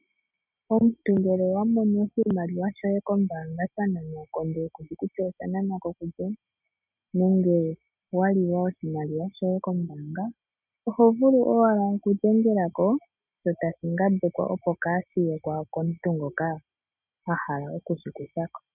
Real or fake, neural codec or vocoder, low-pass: real; none; 3.6 kHz